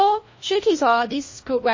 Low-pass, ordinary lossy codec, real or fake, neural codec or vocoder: 7.2 kHz; MP3, 32 kbps; fake; codec, 16 kHz, 1 kbps, FunCodec, trained on LibriTTS, 50 frames a second